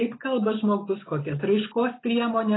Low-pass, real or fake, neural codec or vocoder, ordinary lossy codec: 7.2 kHz; real; none; AAC, 16 kbps